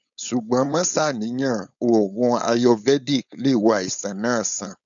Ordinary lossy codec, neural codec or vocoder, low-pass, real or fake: none; codec, 16 kHz, 4.8 kbps, FACodec; 7.2 kHz; fake